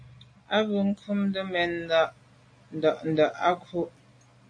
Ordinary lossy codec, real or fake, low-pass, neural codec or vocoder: AAC, 48 kbps; real; 9.9 kHz; none